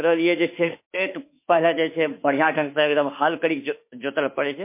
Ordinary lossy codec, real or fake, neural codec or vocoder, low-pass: MP3, 24 kbps; fake; autoencoder, 48 kHz, 32 numbers a frame, DAC-VAE, trained on Japanese speech; 3.6 kHz